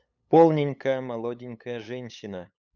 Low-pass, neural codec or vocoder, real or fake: 7.2 kHz; codec, 16 kHz, 8 kbps, FunCodec, trained on LibriTTS, 25 frames a second; fake